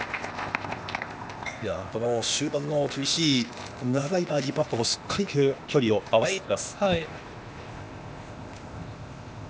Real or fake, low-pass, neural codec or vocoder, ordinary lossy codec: fake; none; codec, 16 kHz, 0.8 kbps, ZipCodec; none